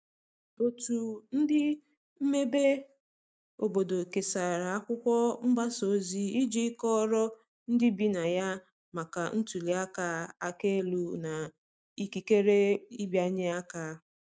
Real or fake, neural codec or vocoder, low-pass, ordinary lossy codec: real; none; none; none